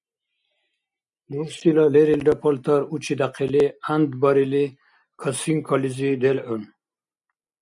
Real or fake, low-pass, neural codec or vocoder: real; 10.8 kHz; none